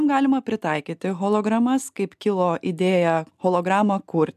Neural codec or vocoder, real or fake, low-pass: none; real; 14.4 kHz